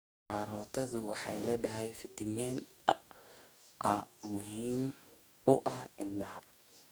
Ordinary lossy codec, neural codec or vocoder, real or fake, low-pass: none; codec, 44.1 kHz, 2.6 kbps, DAC; fake; none